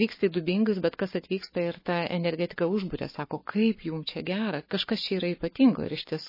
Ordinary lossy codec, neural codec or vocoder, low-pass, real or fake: MP3, 24 kbps; codec, 44.1 kHz, 7.8 kbps, Pupu-Codec; 5.4 kHz; fake